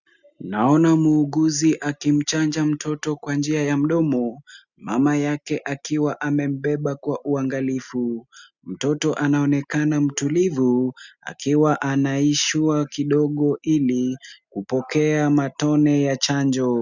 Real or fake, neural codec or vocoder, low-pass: real; none; 7.2 kHz